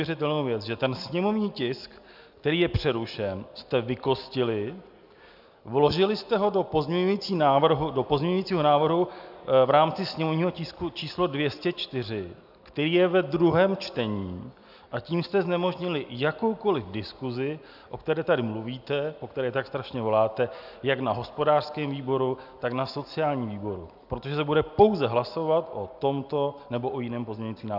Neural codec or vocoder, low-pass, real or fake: none; 5.4 kHz; real